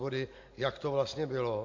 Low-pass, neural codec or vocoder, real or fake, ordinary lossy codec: 7.2 kHz; none; real; MP3, 48 kbps